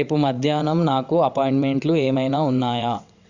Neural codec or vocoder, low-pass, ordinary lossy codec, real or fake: vocoder, 22.05 kHz, 80 mel bands, WaveNeXt; 7.2 kHz; Opus, 64 kbps; fake